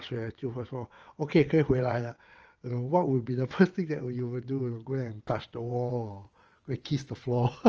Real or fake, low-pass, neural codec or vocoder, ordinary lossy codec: fake; 7.2 kHz; vocoder, 22.05 kHz, 80 mel bands, WaveNeXt; Opus, 24 kbps